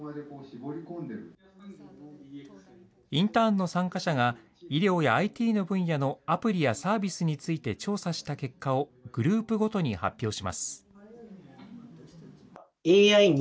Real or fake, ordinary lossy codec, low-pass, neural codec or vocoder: real; none; none; none